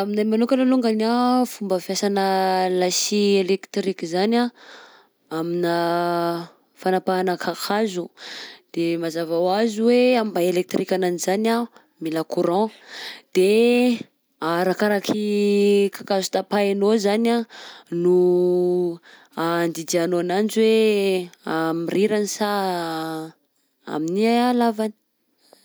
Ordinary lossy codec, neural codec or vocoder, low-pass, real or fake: none; none; none; real